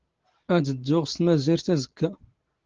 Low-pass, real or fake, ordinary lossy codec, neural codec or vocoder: 7.2 kHz; real; Opus, 16 kbps; none